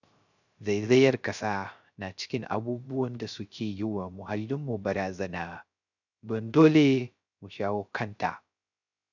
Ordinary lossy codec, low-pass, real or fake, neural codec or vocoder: none; 7.2 kHz; fake; codec, 16 kHz, 0.3 kbps, FocalCodec